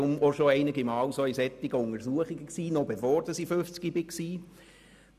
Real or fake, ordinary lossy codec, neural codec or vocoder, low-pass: real; none; none; 14.4 kHz